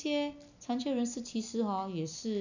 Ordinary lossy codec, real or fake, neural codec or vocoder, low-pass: none; real; none; 7.2 kHz